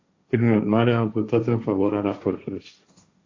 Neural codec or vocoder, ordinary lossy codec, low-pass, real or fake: codec, 16 kHz, 1.1 kbps, Voila-Tokenizer; AAC, 48 kbps; 7.2 kHz; fake